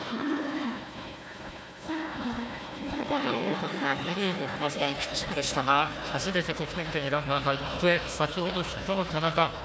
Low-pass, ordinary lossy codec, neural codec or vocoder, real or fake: none; none; codec, 16 kHz, 1 kbps, FunCodec, trained on Chinese and English, 50 frames a second; fake